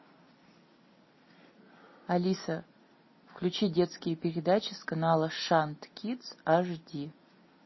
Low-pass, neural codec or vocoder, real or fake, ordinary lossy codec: 7.2 kHz; none; real; MP3, 24 kbps